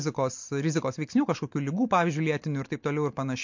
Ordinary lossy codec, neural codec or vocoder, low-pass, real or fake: MP3, 48 kbps; none; 7.2 kHz; real